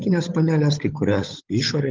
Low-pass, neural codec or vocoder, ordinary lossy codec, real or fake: 7.2 kHz; codec, 16 kHz, 16 kbps, FunCodec, trained on LibriTTS, 50 frames a second; Opus, 32 kbps; fake